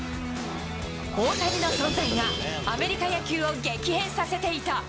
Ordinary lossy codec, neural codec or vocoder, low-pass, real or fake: none; none; none; real